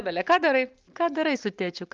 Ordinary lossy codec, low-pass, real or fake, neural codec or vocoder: Opus, 24 kbps; 7.2 kHz; real; none